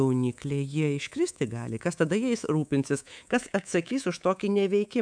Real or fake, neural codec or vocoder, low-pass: fake; codec, 24 kHz, 3.1 kbps, DualCodec; 9.9 kHz